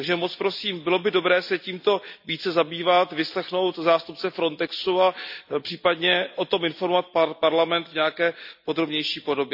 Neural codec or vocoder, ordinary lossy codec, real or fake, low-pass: none; none; real; 5.4 kHz